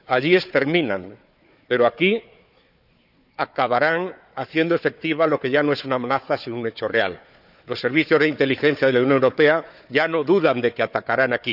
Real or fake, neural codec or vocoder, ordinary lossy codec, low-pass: fake; codec, 16 kHz, 4 kbps, FunCodec, trained on Chinese and English, 50 frames a second; none; 5.4 kHz